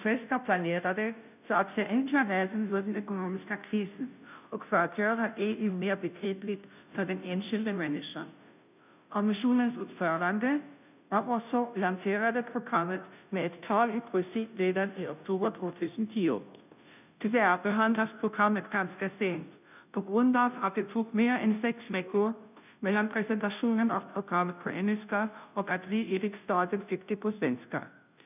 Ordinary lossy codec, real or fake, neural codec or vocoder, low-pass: none; fake; codec, 16 kHz, 0.5 kbps, FunCodec, trained on Chinese and English, 25 frames a second; 3.6 kHz